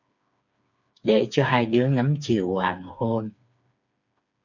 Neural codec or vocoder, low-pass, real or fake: codec, 16 kHz, 4 kbps, FreqCodec, smaller model; 7.2 kHz; fake